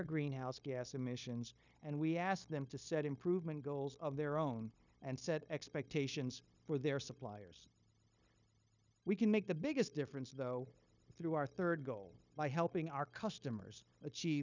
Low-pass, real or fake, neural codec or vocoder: 7.2 kHz; fake; codec, 16 kHz, 0.9 kbps, LongCat-Audio-Codec